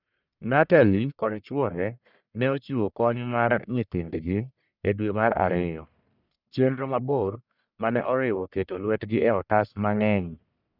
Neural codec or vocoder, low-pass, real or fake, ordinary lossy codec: codec, 44.1 kHz, 1.7 kbps, Pupu-Codec; 5.4 kHz; fake; none